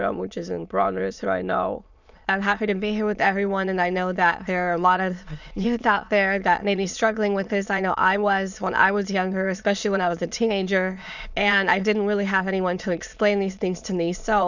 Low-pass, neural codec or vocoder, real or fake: 7.2 kHz; autoencoder, 22.05 kHz, a latent of 192 numbers a frame, VITS, trained on many speakers; fake